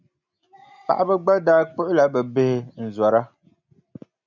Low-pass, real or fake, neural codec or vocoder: 7.2 kHz; real; none